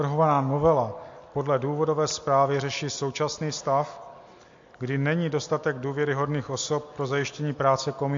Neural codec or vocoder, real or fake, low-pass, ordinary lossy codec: none; real; 7.2 kHz; MP3, 48 kbps